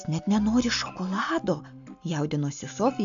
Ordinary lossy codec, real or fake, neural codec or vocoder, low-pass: AAC, 64 kbps; real; none; 7.2 kHz